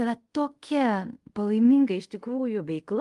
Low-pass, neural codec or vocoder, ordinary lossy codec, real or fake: 10.8 kHz; codec, 24 kHz, 0.5 kbps, DualCodec; Opus, 24 kbps; fake